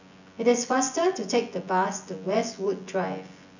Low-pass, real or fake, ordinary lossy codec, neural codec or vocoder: 7.2 kHz; fake; none; vocoder, 24 kHz, 100 mel bands, Vocos